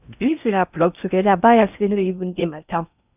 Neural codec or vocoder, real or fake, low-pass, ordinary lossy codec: codec, 16 kHz in and 24 kHz out, 0.6 kbps, FocalCodec, streaming, 4096 codes; fake; 3.6 kHz; none